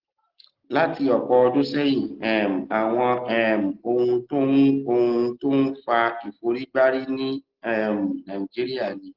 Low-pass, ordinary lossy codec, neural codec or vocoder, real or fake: 5.4 kHz; Opus, 16 kbps; none; real